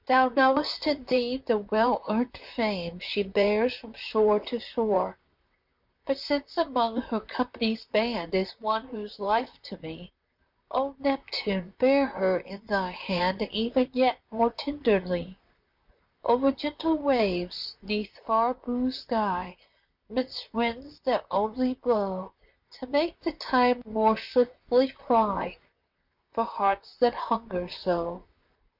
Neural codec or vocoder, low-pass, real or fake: vocoder, 44.1 kHz, 128 mel bands, Pupu-Vocoder; 5.4 kHz; fake